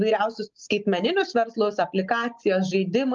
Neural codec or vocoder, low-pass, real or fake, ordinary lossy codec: none; 7.2 kHz; real; Opus, 24 kbps